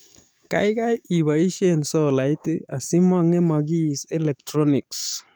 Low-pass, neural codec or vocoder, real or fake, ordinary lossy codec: none; codec, 44.1 kHz, 7.8 kbps, DAC; fake; none